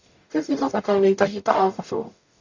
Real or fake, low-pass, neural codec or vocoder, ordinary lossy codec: fake; 7.2 kHz; codec, 44.1 kHz, 0.9 kbps, DAC; AAC, 48 kbps